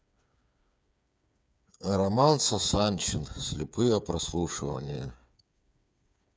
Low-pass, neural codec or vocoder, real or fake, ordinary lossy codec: none; codec, 16 kHz, 8 kbps, FreqCodec, smaller model; fake; none